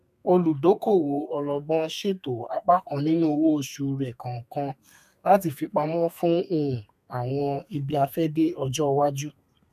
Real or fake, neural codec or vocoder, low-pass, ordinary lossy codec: fake; codec, 32 kHz, 1.9 kbps, SNAC; 14.4 kHz; none